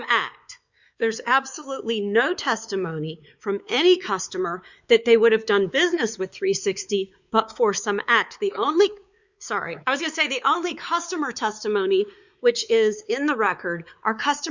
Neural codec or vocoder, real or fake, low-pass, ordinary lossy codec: codec, 16 kHz, 4 kbps, X-Codec, WavLM features, trained on Multilingual LibriSpeech; fake; 7.2 kHz; Opus, 64 kbps